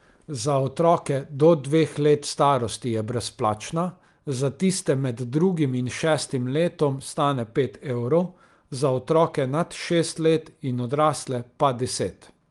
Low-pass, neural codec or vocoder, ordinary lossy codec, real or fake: 10.8 kHz; none; Opus, 32 kbps; real